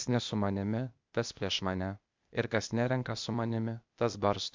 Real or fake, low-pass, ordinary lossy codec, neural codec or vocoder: fake; 7.2 kHz; MP3, 64 kbps; codec, 16 kHz, 0.8 kbps, ZipCodec